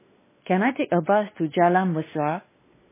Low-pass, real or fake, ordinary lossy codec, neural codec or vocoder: 3.6 kHz; fake; MP3, 16 kbps; codec, 16 kHz, 2 kbps, X-Codec, WavLM features, trained on Multilingual LibriSpeech